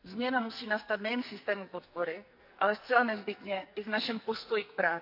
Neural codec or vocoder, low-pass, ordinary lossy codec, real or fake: codec, 44.1 kHz, 2.6 kbps, SNAC; 5.4 kHz; none; fake